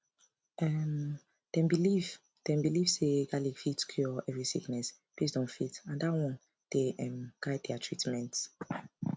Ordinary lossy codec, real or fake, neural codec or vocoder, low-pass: none; real; none; none